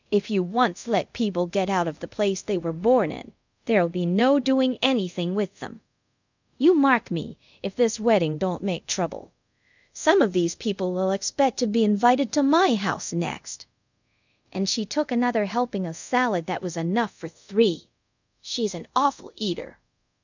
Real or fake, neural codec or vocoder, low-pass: fake; codec, 24 kHz, 0.5 kbps, DualCodec; 7.2 kHz